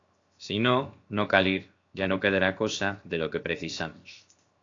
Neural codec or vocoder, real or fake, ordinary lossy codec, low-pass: codec, 16 kHz, 0.7 kbps, FocalCodec; fake; AAC, 48 kbps; 7.2 kHz